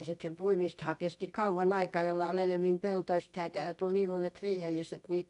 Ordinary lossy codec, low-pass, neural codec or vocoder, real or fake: none; 10.8 kHz; codec, 24 kHz, 0.9 kbps, WavTokenizer, medium music audio release; fake